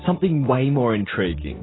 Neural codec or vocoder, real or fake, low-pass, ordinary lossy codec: none; real; 7.2 kHz; AAC, 16 kbps